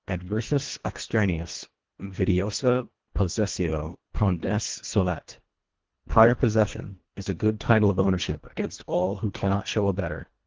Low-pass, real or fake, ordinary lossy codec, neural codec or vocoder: 7.2 kHz; fake; Opus, 16 kbps; codec, 24 kHz, 1.5 kbps, HILCodec